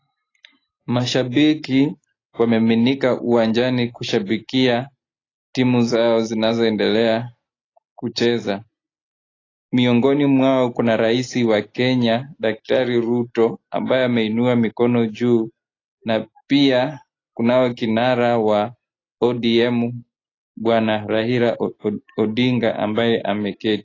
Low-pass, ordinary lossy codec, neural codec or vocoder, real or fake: 7.2 kHz; AAC, 32 kbps; none; real